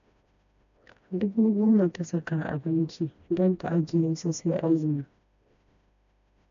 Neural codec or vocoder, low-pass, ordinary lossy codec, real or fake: codec, 16 kHz, 1 kbps, FreqCodec, smaller model; 7.2 kHz; AAC, 96 kbps; fake